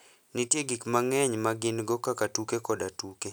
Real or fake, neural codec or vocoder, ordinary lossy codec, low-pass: real; none; none; none